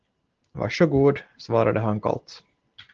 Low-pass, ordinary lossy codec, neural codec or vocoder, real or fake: 7.2 kHz; Opus, 16 kbps; none; real